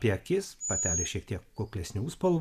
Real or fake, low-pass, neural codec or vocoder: real; 14.4 kHz; none